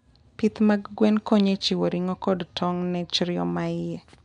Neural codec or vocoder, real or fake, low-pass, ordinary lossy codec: none; real; 10.8 kHz; none